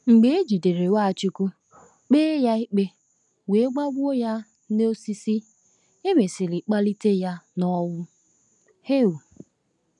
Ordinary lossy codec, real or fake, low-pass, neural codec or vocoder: none; real; 10.8 kHz; none